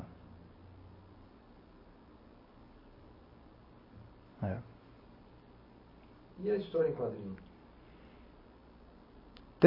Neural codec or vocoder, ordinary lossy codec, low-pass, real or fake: none; MP3, 24 kbps; 5.4 kHz; real